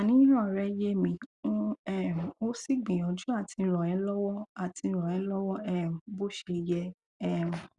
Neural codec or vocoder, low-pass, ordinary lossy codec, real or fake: none; 10.8 kHz; Opus, 64 kbps; real